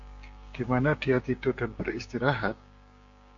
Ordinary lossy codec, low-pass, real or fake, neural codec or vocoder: MP3, 48 kbps; 7.2 kHz; fake; codec, 16 kHz, 6 kbps, DAC